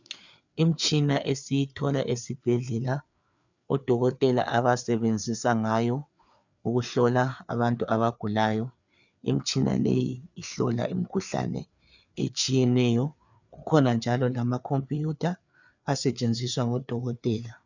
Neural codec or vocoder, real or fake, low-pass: codec, 16 kHz, 4 kbps, FreqCodec, larger model; fake; 7.2 kHz